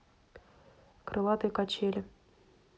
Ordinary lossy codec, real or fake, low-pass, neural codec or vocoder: none; real; none; none